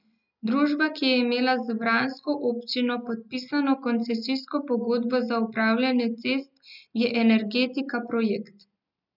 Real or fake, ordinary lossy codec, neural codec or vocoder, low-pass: real; none; none; 5.4 kHz